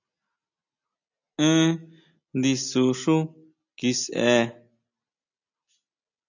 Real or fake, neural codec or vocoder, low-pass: real; none; 7.2 kHz